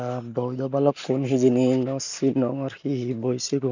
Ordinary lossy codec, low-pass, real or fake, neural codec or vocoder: none; 7.2 kHz; real; none